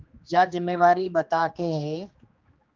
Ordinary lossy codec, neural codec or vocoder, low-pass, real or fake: Opus, 24 kbps; codec, 16 kHz, 2 kbps, X-Codec, HuBERT features, trained on general audio; 7.2 kHz; fake